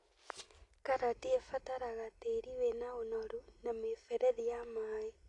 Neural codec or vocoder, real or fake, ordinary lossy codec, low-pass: none; real; AAC, 48 kbps; 10.8 kHz